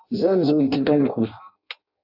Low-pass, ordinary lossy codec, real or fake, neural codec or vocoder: 5.4 kHz; AAC, 48 kbps; fake; codec, 16 kHz in and 24 kHz out, 0.6 kbps, FireRedTTS-2 codec